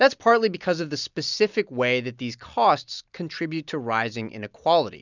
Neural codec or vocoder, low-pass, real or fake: none; 7.2 kHz; real